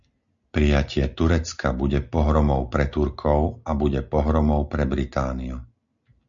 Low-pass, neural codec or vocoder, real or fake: 7.2 kHz; none; real